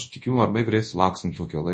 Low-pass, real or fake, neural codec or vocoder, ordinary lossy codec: 10.8 kHz; fake; codec, 24 kHz, 0.9 kbps, WavTokenizer, large speech release; MP3, 32 kbps